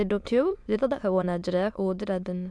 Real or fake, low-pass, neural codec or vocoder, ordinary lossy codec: fake; none; autoencoder, 22.05 kHz, a latent of 192 numbers a frame, VITS, trained on many speakers; none